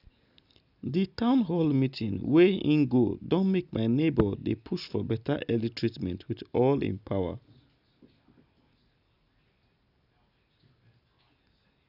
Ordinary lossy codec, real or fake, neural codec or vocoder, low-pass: none; real; none; 5.4 kHz